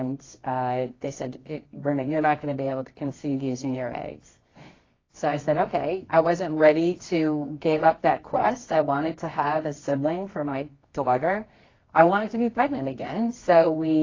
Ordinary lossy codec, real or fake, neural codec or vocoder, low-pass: AAC, 32 kbps; fake; codec, 24 kHz, 0.9 kbps, WavTokenizer, medium music audio release; 7.2 kHz